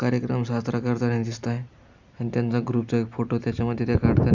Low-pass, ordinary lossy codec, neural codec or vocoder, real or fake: 7.2 kHz; none; none; real